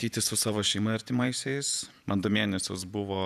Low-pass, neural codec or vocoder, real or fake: 14.4 kHz; vocoder, 48 kHz, 128 mel bands, Vocos; fake